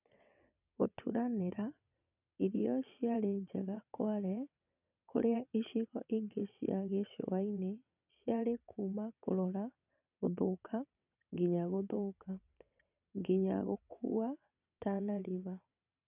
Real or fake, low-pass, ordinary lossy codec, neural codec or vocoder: fake; 3.6 kHz; none; vocoder, 22.05 kHz, 80 mel bands, WaveNeXt